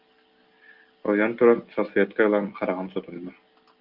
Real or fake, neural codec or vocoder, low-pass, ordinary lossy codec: real; none; 5.4 kHz; Opus, 32 kbps